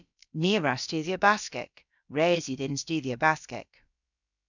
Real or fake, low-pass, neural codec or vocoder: fake; 7.2 kHz; codec, 16 kHz, about 1 kbps, DyCAST, with the encoder's durations